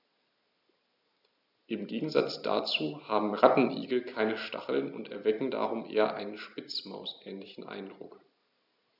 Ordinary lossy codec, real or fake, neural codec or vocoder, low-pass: none; real; none; 5.4 kHz